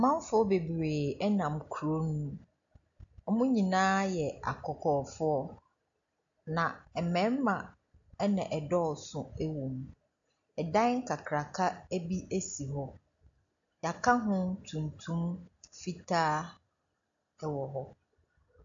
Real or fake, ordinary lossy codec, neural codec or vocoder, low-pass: real; AAC, 64 kbps; none; 7.2 kHz